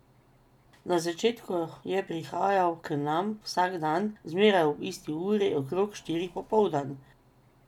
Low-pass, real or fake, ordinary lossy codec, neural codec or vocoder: 19.8 kHz; real; none; none